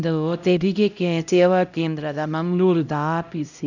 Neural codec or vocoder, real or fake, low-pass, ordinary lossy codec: codec, 16 kHz, 0.5 kbps, X-Codec, HuBERT features, trained on LibriSpeech; fake; 7.2 kHz; none